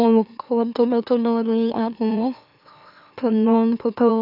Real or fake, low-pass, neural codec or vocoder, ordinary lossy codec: fake; 5.4 kHz; autoencoder, 44.1 kHz, a latent of 192 numbers a frame, MeloTTS; none